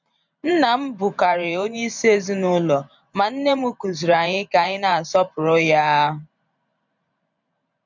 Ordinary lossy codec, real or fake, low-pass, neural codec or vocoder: none; fake; 7.2 kHz; vocoder, 44.1 kHz, 128 mel bands every 512 samples, BigVGAN v2